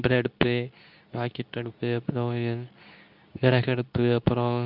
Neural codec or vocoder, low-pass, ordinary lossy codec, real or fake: codec, 24 kHz, 0.9 kbps, WavTokenizer, medium speech release version 2; 5.4 kHz; none; fake